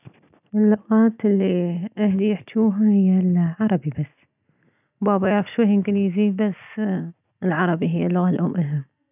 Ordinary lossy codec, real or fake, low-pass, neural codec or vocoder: none; real; 3.6 kHz; none